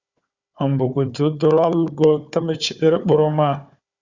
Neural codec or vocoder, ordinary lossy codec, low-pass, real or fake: codec, 16 kHz, 4 kbps, FunCodec, trained on Chinese and English, 50 frames a second; Opus, 64 kbps; 7.2 kHz; fake